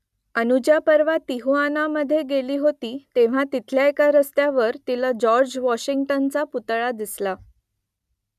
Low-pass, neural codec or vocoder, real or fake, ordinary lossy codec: 14.4 kHz; none; real; none